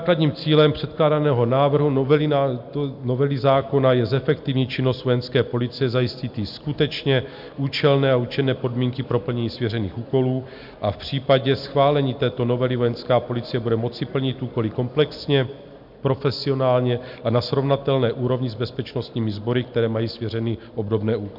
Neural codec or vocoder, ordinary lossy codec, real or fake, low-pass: none; MP3, 48 kbps; real; 5.4 kHz